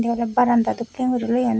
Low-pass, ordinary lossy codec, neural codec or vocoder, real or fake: none; none; none; real